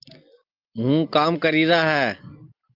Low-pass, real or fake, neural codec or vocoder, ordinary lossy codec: 5.4 kHz; real; none; Opus, 24 kbps